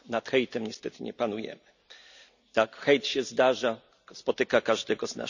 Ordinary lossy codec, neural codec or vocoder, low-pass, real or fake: none; none; 7.2 kHz; real